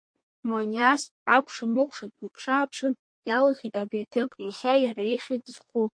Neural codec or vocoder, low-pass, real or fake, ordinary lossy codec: codec, 24 kHz, 1 kbps, SNAC; 9.9 kHz; fake; MP3, 48 kbps